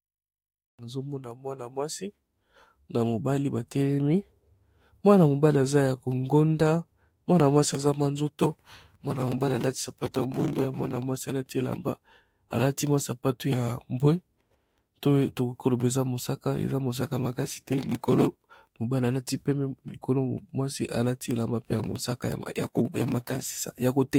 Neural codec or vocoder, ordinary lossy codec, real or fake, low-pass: autoencoder, 48 kHz, 32 numbers a frame, DAC-VAE, trained on Japanese speech; AAC, 48 kbps; fake; 19.8 kHz